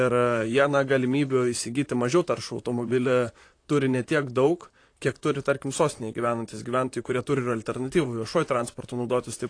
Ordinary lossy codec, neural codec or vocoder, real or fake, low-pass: AAC, 48 kbps; vocoder, 44.1 kHz, 128 mel bands, Pupu-Vocoder; fake; 9.9 kHz